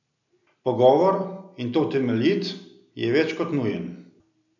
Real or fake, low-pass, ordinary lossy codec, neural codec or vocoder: real; 7.2 kHz; none; none